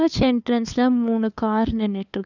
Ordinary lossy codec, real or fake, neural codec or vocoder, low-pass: none; fake; codec, 16 kHz, 2 kbps, FunCodec, trained on LibriTTS, 25 frames a second; 7.2 kHz